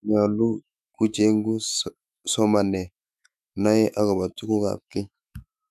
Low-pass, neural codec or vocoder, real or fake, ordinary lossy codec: 14.4 kHz; autoencoder, 48 kHz, 128 numbers a frame, DAC-VAE, trained on Japanese speech; fake; none